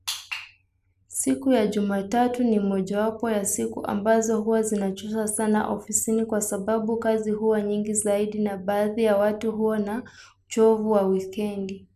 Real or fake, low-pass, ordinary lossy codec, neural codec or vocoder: real; 14.4 kHz; none; none